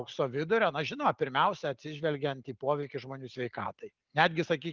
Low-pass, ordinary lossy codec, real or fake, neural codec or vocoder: 7.2 kHz; Opus, 32 kbps; real; none